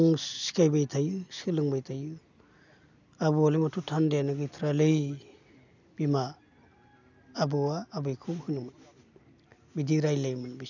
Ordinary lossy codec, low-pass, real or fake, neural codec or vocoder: none; 7.2 kHz; real; none